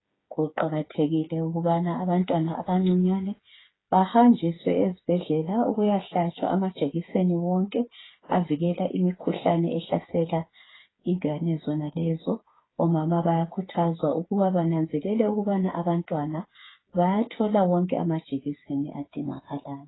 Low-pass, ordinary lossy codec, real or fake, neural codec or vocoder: 7.2 kHz; AAC, 16 kbps; fake; codec, 16 kHz, 8 kbps, FreqCodec, smaller model